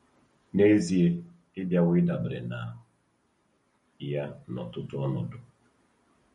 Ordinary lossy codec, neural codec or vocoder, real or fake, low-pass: MP3, 48 kbps; none; real; 19.8 kHz